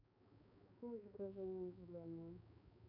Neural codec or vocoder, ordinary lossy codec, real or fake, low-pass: codec, 16 kHz, 4 kbps, X-Codec, HuBERT features, trained on balanced general audio; MP3, 48 kbps; fake; 5.4 kHz